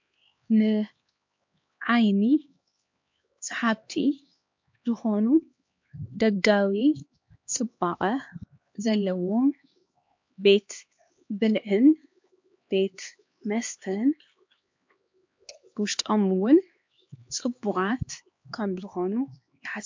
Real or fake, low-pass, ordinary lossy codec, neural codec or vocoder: fake; 7.2 kHz; MP3, 48 kbps; codec, 16 kHz, 2 kbps, X-Codec, HuBERT features, trained on LibriSpeech